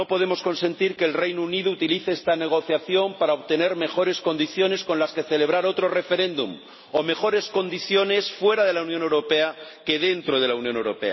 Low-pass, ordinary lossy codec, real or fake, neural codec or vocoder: 7.2 kHz; MP3, 24 kbps; real; none